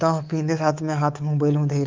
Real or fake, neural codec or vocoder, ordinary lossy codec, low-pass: fake; codec, 24 kHz, 3.1 kbps, DualCodec; Opus, 32 kbps; 7.2 kHz